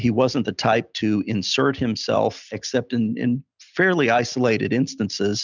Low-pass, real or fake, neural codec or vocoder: 7.2 kHz; real; none